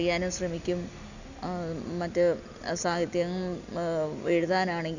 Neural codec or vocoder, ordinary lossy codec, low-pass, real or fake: none; none; 7.2 kHz; real